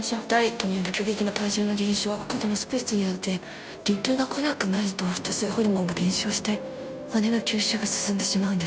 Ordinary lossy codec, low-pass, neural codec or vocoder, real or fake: none; none; codec, 16 kHz, 0.5 kbps, FunCodec, trained on Chinese and English, 25 frames a second; fake